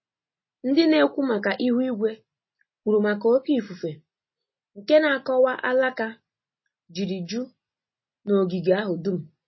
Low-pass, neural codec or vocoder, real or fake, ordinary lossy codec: 7.2 kHz; none; real; MP3, 24 kbps